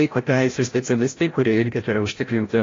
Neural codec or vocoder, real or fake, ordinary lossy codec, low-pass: codec, 16 kHz, 0.5 kbps, FreqCodec, larger model; fake; AAC, 32 kbps; 7.2 kHz